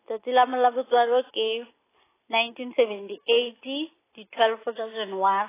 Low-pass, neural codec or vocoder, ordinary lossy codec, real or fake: 3.6 kHz; none; AAC, 16 kbps; real